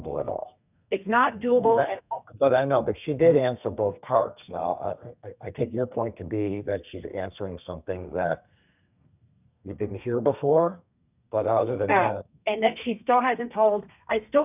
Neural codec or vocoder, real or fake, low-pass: codec, 32 kHz, 1.9 kbps, SNAC; fake; 3.6 kHz